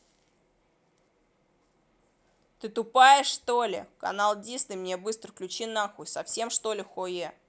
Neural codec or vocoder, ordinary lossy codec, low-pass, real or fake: none; none; none; real